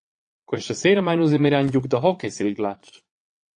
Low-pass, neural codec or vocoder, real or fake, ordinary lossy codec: 9.9 kHz; vocoder, 22.05 kHz, 80 mel bands, Vocos; fake; AAC, 48 kbps